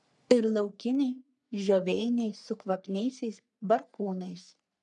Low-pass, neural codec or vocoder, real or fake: 10.8 kHz; codec, 44.1 kHz, 3.4 kbps, Pupu-Codec; fake